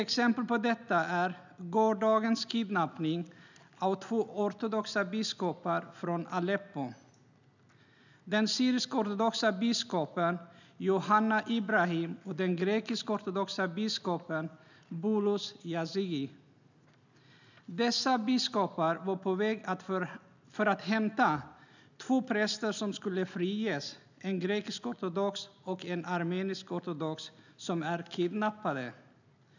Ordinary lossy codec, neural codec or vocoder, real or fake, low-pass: none; none; real; 7.2 kHz